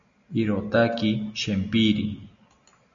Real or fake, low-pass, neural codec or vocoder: real; 7.2 kHz; none